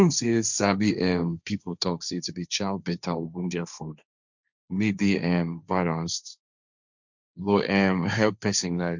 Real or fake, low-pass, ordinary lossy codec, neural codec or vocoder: fake; 7.2 kHz; none; codec, 16 kHz, 1.1 kbps, Voila-Tokenizer